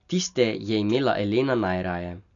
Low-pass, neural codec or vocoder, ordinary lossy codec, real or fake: 7.2 kHz; none; none; real